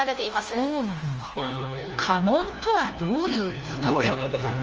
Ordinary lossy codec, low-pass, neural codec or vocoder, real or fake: Opus, 24 kbps; 7.2 kHz; codec, 16 kHz, 1 kbps, FunCodec, trained on LibriTTS, 50 frames a second; fake